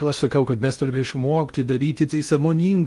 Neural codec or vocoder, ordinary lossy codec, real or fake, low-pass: codec, 16 kHz in and 24 kHz out, 0.6 kbps, FocalCodec, streaming, 2048 codes; Opus, 32 kbps; fake; 10.8 kHz